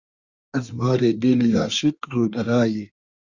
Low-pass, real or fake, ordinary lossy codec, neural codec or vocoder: 7.2 kHz; fake; Opus, 64 kbps; codec, 24 kHz, 1 kbps, SNAC